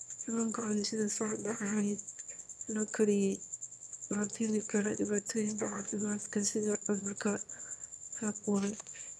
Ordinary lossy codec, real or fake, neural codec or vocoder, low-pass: none; fake; autoencoder, 22.05 kHz, a latent of 192 numbers a frame, VITS, trained on one speaker; none